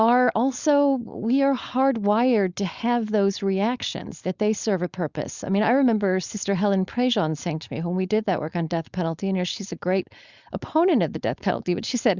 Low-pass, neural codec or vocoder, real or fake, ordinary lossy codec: 7.2 kHz; codec, 16 kHz, 4.8 kbps, FACodec; fake; Opus, 64 kbps